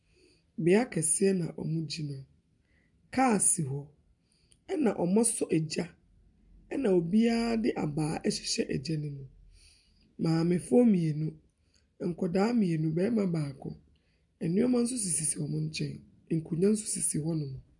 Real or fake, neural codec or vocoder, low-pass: real; none; 10.8 kHz